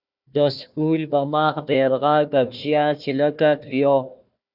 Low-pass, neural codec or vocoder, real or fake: 5.4 kHz; codec, 16 kHz, 1 kbps, FunCodec, trained on Chinese and English, 50 frames a second; fake